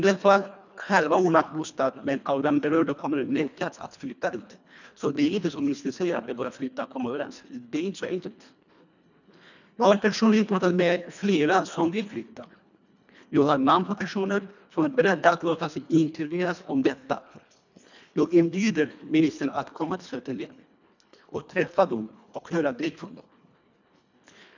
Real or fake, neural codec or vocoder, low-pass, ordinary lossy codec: fake; codec, 24 kHz, 1.5 kbps, HILCodec; 7.2 kHz; none